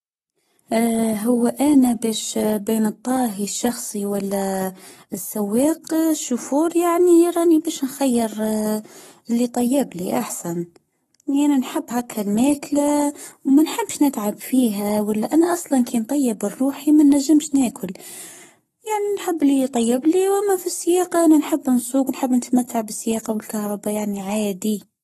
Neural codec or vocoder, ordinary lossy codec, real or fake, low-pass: codec, 44.1 kHz, 7.8 kbps, Pupu-Codec; AAC, 32 kbps; fake; 19.8 kHz